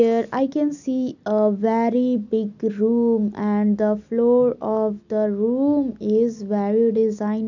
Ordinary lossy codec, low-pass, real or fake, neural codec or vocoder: none; 7.2 kHz; real; none